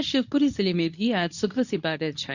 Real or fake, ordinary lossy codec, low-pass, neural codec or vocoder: fake; none; 7.2 kHz; codec, 24 kHz, 0.9 kbps, WavTokenizer, medium speech release version 2